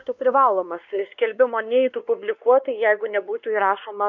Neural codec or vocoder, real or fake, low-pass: codec, 16 kHz, 2 kbps, X-Codec, WavLM features, trained on Multilingual LibriSpeech; fake; 7.2 kHz